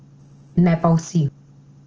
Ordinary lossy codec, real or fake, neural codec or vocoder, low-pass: Opus, 16 kbps; real; none; 7.2 kHz